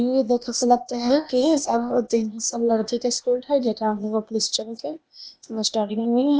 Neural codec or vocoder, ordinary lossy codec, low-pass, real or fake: codec, 16 kHz, 0.8 kbps, ZipCodec; none; none; fake